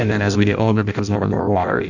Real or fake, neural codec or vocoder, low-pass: fake; codec, 16 kHz in and 24 kHz out, 0.6 kbps, FireRedTTS-2 codec; 7.2 kHz